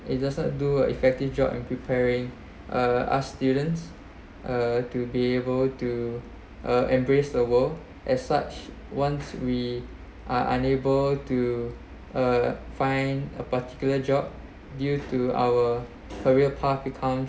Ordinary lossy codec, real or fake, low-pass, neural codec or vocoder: none; real; none; none